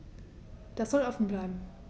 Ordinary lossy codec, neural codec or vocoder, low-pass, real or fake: none; none; none; real